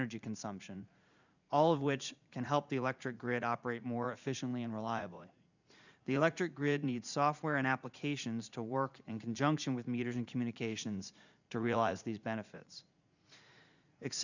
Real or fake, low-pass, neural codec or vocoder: fake; 7.2 kHz; vocoder, 44.1 kHz, 80 mel bands, Vocos